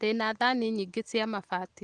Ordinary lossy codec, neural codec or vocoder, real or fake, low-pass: Opus, 24 kbps; none; real; 10.8 kHz